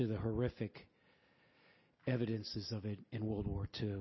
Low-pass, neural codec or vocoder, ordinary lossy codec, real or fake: 7.2 kHz; none; MP3, 24 kbps; real